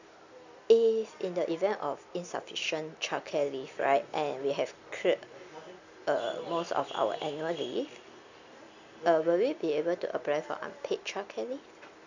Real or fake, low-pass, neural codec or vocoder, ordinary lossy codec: real; 7.2 kHz; none; none